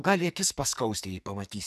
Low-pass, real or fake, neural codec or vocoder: 14.4 kHz; fake; codec, 44.1 kHz, 2.6 kbps, SNAC